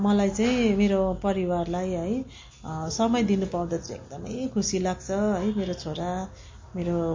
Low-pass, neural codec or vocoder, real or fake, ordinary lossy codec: 7.2 kHz; none; real; MP3, 32 kbps